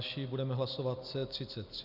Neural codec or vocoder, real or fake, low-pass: none; real; 5.4 kHz